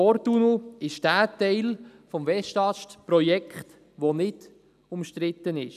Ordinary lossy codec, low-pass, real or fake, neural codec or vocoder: none; 14.4 kHz; real; none